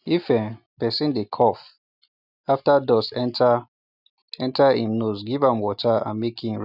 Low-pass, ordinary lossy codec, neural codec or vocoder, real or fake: 5.4 kHz; none; none; real